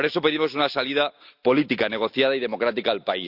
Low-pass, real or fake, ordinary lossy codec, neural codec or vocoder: 5.4 kHz; real; Opus, 64 kbps; none